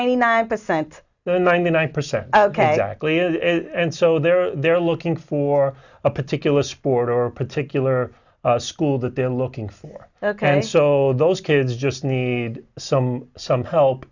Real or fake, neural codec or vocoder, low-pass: real; none; 7.2 kHz